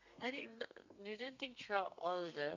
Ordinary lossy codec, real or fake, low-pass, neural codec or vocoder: none; fake; 7.2 kHz; codec, 32 kHz, 1.9 kbps, SNAC